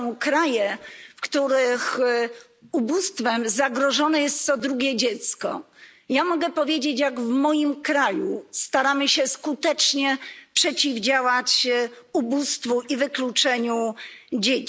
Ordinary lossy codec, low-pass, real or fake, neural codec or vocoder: none; none; real; none